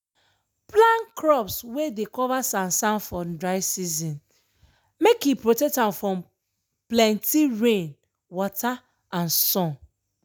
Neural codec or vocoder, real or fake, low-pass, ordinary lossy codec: none; real; none; none